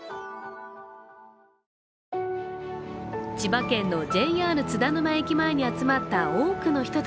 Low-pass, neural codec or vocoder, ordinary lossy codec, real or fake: none; none; none; real